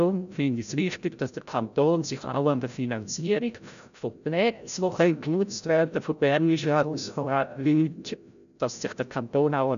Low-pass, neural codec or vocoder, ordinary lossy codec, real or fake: 7.2 kHz; codec, 16 kHz, 0.5 kbps, FreqCodec, larger model; none; fake